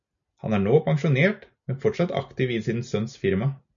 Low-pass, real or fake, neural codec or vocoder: 7.2 kHz; real; none